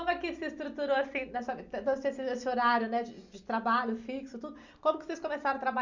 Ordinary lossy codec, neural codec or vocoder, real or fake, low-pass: Opus, 64 kbps; none; real; 7.2 kHz